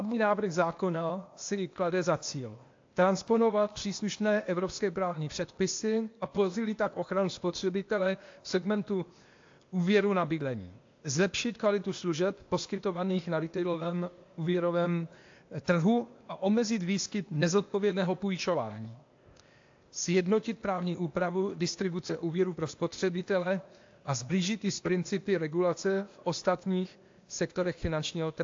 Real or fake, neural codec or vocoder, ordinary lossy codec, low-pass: fake; codec, 16 kHz, 0.8 kbps, ZipCodec; AAC, 48 kbps; 7.2 kHz